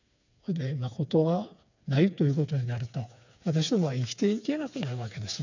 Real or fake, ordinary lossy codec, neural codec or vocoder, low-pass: fake; none; codec, 16 kHz, 4 kbps, FreqCodec, smaller model; 7.2 kHz